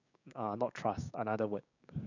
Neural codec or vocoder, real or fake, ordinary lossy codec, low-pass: codec, 16 kHz, 6 kbps, DAC; fake; none; 7.2 kHz